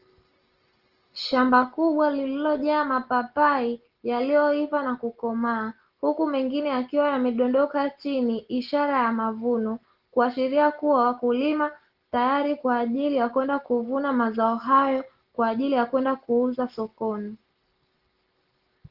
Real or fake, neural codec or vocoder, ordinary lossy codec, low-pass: real; none; Opus, 16 kbps; 5.4 kHz